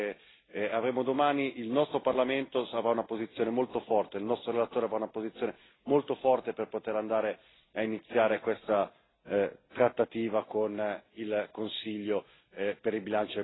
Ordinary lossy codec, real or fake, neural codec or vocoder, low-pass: AAC, 16 kbps; real; none; 7.2 kHz